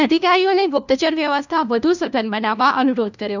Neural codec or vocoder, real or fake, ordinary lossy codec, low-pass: codec, 16 kHz, 1 kbps, FunCodec, trained on LibriTTS, 50 frames a second; fake; none; 7.2 kHz